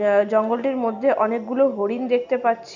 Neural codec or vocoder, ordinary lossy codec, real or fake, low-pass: none; none; real; 7.2 kHz